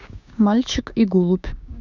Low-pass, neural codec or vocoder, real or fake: 7.2 kHz; codec, 16 kHz, 6 kbps, DAC; fake